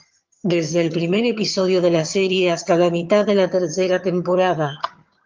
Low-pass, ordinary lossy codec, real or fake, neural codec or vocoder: 7.2 kHz; Opus, 32 kbps; fake; vocoder, 22.05 kHz, 80 mel bands, HiFi-GAN